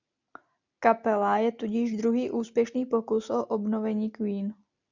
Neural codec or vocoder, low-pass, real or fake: none; 7.2 kHz; real